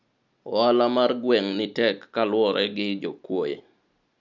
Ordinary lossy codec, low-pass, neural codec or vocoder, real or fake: none; 7.2 kHz; none; real